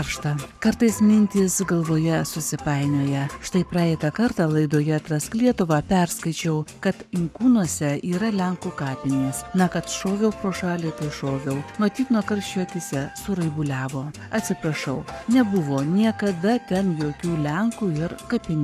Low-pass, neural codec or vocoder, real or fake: 14.4 kHz; codec, 44.1 kHz, 7.8 kbps, Pupu-Codec; fake